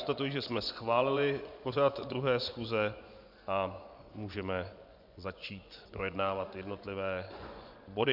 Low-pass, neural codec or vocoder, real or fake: 5.4 kHz; vocoder, 24 kHz, 100 mel bands, Vocos; fake